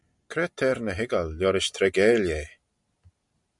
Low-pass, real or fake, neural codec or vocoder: 10.8 kHz; real; none